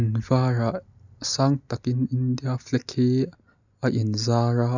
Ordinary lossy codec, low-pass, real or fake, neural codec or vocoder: none; 7.2 kHz; real; none